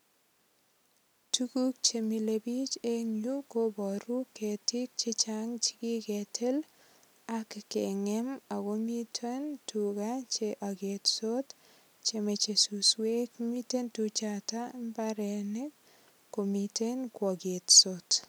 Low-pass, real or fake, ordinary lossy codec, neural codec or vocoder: none; real; none; none